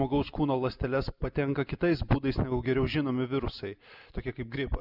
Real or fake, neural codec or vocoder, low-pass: real; none; 5.4 kHz